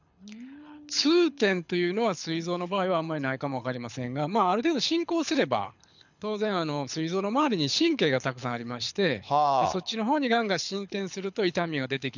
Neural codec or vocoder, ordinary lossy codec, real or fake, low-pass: codec, 24 kHz, 6 kbps, HILCodec; none; fake; 7.2 kHz